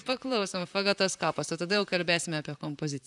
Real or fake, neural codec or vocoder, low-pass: fake; vocoder, 44.1 kHz, 128 mel bands, Pupu-Vocoder; 10.8 kHz